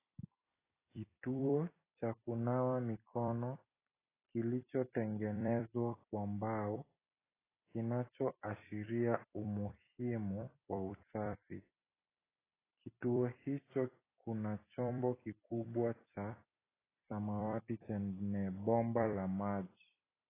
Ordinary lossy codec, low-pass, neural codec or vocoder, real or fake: AAC, 16 kbps; 3.6 kHz; vocoder, 44.1 kHz, 128 mel bands every 256 samples, BigVGAN v2; fake